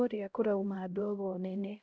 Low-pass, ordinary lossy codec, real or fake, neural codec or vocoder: none; none; fake; codec, 16 kHz, 0.5 kbps, X-Codec, HuBERT features, trained on LibriSpeech